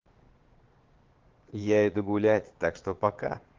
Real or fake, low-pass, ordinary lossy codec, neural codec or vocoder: fake; 7.2 kHz; Opus, 16 kbps; vocoder, 22.05 kHz, 80 mel bands, Vocos